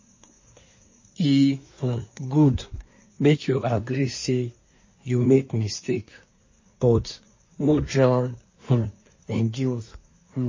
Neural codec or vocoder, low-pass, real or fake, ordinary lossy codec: codec, 24 kHz, 1 kbps, SNAC; 7.2 kHz; fake; MP3, 32 kbps